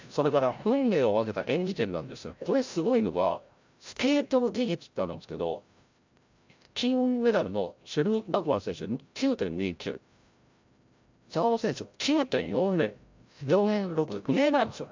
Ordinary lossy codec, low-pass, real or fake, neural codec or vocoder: MP3, 64 kbps; 7.2 kHz; fake; codec, 16 kHz, 0.5 kbps, FreqCodec, larger model